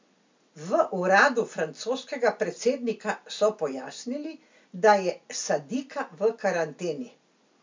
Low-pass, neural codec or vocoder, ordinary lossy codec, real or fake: 7.2 kHz; none; MP3, 64 kbps; real